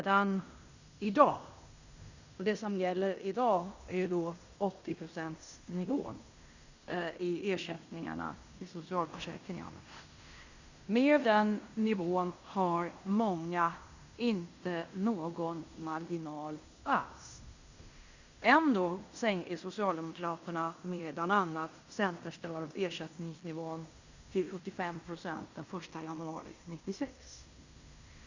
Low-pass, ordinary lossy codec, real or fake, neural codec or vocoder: 7.2 kHz; Opus, 64 kbps; fake; codec, 16 kHz in and 24 kHz out, 0.9 kbps, LongCat-Audio-Codec, fine tuned four codebook decoder